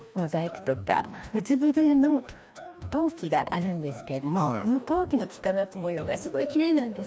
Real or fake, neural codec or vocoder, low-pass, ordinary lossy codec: fake; codec, 16 kHz, 1 kbps, FreqCodec, larger model; none; none